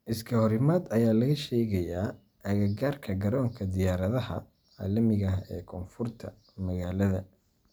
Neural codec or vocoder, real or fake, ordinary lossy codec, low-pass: none; real; none; none